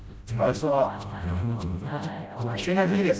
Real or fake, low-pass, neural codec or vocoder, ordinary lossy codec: fake; none; codec, 16 kHz, 0.5 kbps, FreqCodec, smaller model; none